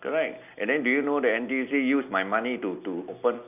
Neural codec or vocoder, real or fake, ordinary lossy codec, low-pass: none; real; none; 3.6 kHz